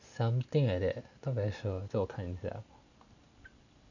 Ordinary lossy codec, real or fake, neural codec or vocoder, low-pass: none; real; none; 7.2 kHz